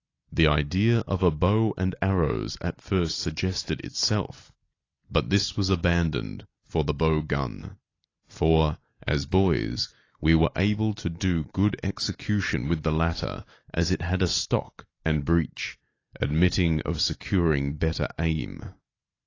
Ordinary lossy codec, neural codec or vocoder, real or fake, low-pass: AAC, 32 kbps; none; real; 7.2 kHz